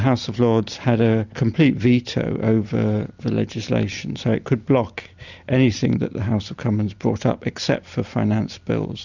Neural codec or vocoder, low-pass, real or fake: none; 7.2 kHz; real